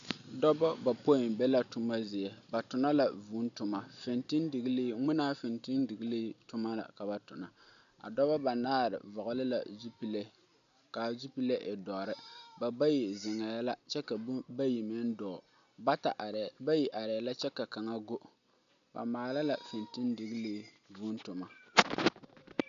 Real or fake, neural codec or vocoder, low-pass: real; none; 7.2 kHz